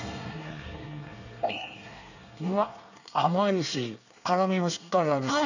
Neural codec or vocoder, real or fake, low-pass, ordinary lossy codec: codec, 24 kHz, 1 kbps, SNAC; fake; 7.2 kHz; none